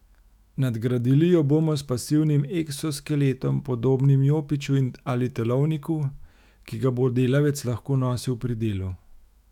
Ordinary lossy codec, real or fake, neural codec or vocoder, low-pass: none; fake; autoencoder, 48 kHz, 128 numbers a frame, DAC-VAE, trained on Japanese speech; 19.8 kHz